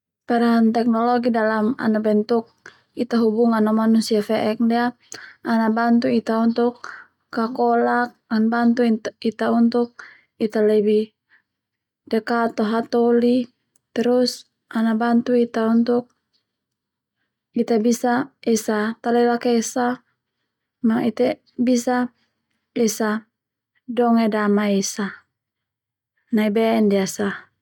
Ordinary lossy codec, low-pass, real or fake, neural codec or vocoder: none; 19.8 kHz; real; none